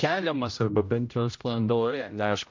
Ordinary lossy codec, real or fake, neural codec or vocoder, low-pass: MP3, 48 kbps; fake; codec, 16 kHz, 0.5 kbps, X-Codec, HuBERT features, trained on general audio; 7.2 kHz